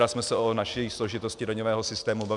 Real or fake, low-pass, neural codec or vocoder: real; 10.8 kHz; none